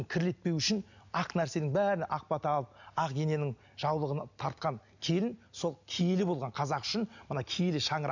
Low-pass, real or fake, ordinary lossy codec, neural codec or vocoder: 7.2 kHz; real; none; none